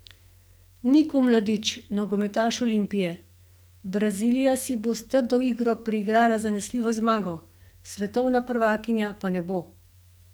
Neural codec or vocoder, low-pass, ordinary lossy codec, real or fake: codec, 44.1 kHz, 2.6 kbps, SNAC; none; none; fake